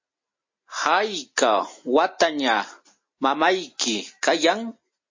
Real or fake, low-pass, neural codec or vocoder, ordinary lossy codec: real; 7.2 kHz; none; MP3, 32 kbps